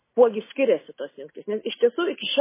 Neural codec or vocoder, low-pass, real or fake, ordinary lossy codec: none; 3.6 kHz; real; MP3, 16 kbps